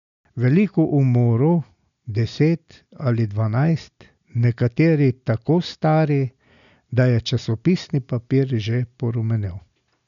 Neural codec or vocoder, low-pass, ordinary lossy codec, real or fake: none; 7.2 kHz; none; real